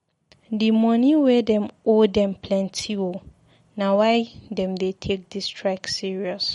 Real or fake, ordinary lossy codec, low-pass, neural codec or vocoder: real; MP3, 48 kbps; 19.8 kHz; none